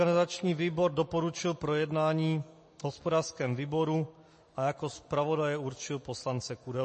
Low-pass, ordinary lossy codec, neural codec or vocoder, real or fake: 10.8 kHz; MP3, 32 kbps; none; real